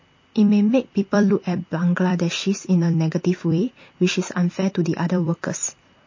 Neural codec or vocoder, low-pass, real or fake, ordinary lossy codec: vocoder, 44.1 kHz, 128 mel bands every 256 samples, BigVGAN v2; 7.2 kHz; fake; MP3, 32 kbps